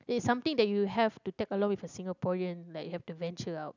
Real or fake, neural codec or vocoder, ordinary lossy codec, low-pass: real; none; none; 7.2 kHz